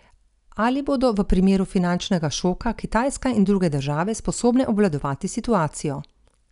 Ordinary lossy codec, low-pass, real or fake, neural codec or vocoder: none; 10.8 kHz; real; none